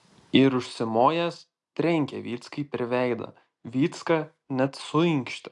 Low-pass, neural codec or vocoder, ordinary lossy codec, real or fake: 10.8 kHz; none; AAC, 64 kbps; real